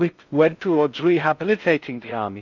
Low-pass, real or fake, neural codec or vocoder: 7.2 kHz; fake; codec, 16 kHz in and 24 kHz out, 0.6 kbps, FocalCodec, streaming, 4096 codes